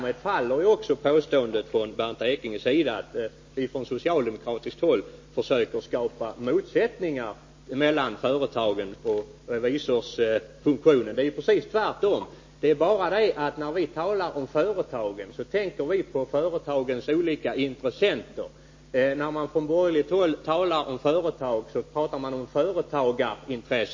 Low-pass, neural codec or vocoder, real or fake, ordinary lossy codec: 7.2 kHz; none; real; MP3, 32 kbps